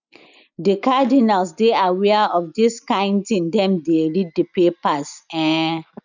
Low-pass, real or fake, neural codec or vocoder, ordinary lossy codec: 7.2 kHz; real; none; none